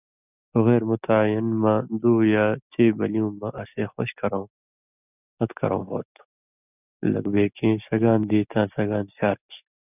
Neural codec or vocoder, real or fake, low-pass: none; real; 3.6 kHz